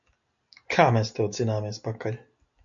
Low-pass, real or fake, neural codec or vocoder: 7.2 kHz; real; none